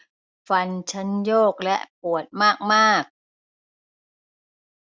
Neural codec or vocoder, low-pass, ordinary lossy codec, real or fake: none; none; none; real